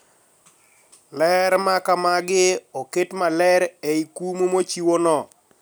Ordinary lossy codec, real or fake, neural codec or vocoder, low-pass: none; real; none; none